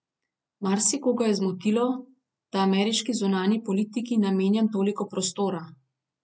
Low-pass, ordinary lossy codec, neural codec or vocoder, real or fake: none; none; none; real